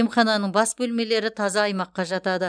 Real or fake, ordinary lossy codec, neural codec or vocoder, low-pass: real; none; none; 9.9 kHz